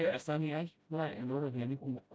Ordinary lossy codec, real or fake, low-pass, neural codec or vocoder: none; fake; none; codec, 16 kHz, 0.5 kbps, FreqCodec, smaller model